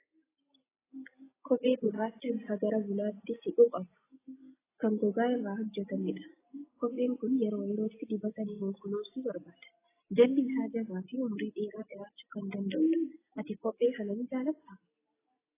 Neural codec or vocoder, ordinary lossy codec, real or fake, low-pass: none; AAC, 16 kbps; real; 3.6 kHz